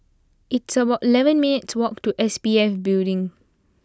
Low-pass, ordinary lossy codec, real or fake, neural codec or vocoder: none; none; real; none